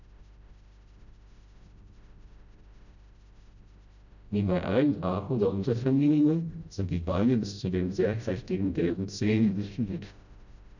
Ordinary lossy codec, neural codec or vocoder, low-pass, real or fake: none; codec, 16 kHz, 0.5 kbps, FreqCodec, smaller model; 7.2 kHz; fake